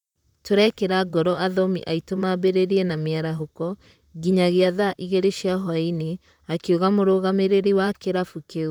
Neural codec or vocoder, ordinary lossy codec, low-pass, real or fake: vocoder, 44.1 kHz, 128 mel bands, Pupu-Vocoder; none; 19.8 kHz; fake